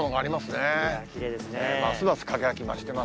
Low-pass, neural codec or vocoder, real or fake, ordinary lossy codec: none; none; real; none